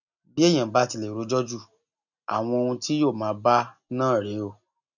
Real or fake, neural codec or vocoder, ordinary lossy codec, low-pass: real; none; none; 7.2 kHz